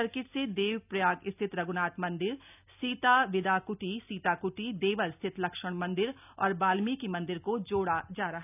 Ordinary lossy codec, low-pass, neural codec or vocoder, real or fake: none; 3.6 kHz; none; real